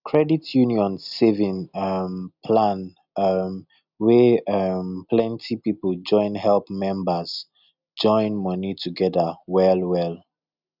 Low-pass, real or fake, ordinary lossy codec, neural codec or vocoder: 5.4 kHz; real; none; none